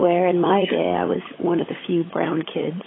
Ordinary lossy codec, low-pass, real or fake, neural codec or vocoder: AAC, 16 kbps; 7.2 kHz; fake; codec, 16 kHz, 16 kbps, FunCodec, trained on LibriTTS, 50 frames a second